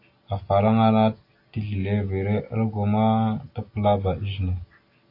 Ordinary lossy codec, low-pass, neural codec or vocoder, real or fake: AAC, 32 kbps; 5.4 kHz; none; real